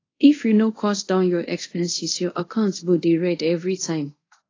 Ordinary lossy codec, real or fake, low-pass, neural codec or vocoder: AAC, 32 kbps; fake; 7.2 kHz; codec, 24 kHz, 0.5 kbps, DualCodec